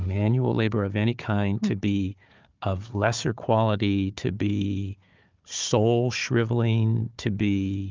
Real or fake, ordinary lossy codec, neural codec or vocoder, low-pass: fake; Opus, 24 kbps; codec, 16 kHz, 4 kbps, FunCodec, trained on Chinese and English, 50 frames a second; 7.2 kHz